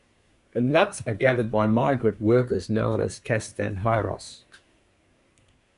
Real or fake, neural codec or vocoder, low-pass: fake; codec, 24 kHz, 1 kbps, SNAC; 10.8 kHz